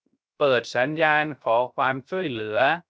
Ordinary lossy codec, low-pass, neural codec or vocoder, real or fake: none; none; codec, 16 kHz, 0.3 kbps, FocalCodec; fake